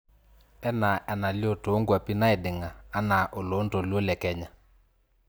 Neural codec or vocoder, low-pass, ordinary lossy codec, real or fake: none; none; none; real